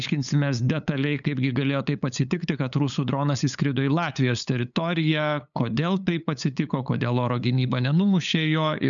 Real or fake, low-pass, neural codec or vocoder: fake; 7.2 kHz; codec, 16 kHz, 8 kbps, FunCodec, trained on LibriTTS, 25 frames a second